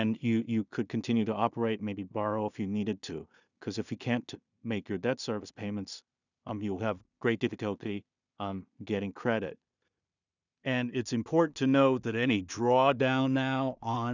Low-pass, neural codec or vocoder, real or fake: 7.2 kHz; codec, 16 kHz in and 24 kHz out, 0.4 kbps, LongCat-Audio-Codec, two codebook decoder; fake